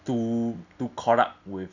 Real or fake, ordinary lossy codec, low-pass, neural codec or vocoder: real; none; 7.2 kHz; none